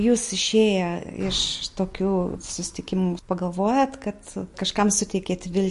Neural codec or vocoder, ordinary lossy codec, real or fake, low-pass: none; MP3, 48 kbps; real; 14.4 kHz